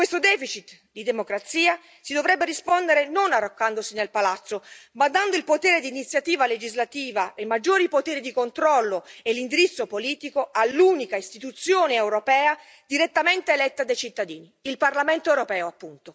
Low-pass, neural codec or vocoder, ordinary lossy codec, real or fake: none; none; none; real